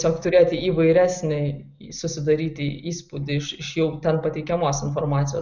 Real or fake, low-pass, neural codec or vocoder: real; 7.2 kHz; none